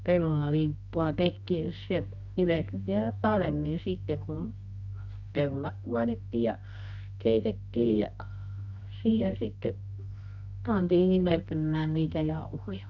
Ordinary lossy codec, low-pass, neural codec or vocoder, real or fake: none; 7.2 kHz; codec, 24 kHz, 0.9 kbps, WavTokenizer, medium music audio release; fake